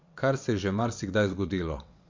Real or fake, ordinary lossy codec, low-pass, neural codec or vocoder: fake; MP3, 48 kbps; 7.2 kHz; vocoder, 22.05 kHz, 80 mel bands, WaveNeXt